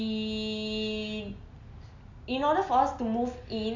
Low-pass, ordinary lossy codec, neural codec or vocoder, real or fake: 7.2 kHz; none; none; real